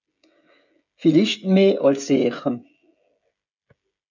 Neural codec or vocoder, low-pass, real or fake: codec, 16 kHz, 16 kbps, FreqCodec, smaller model; 7.2 kHz; fake